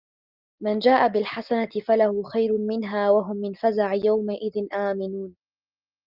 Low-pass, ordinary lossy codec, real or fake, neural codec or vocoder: 5.4 kHz; Opus, 24 kbps; real; none